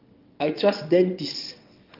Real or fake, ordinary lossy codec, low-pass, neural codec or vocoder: fake; Opus, 32 kbps; 5.4 kHz; vocoder, 22.05 kHz, 80 mel bands, WaveNeXt